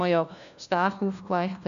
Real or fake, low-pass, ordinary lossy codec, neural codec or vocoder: fake; 7.2 kHz; AAC, 96 kbps; codec, 16 kHz, 1 kbps, FunCodec, trained on LibriTTS, 50 frames a second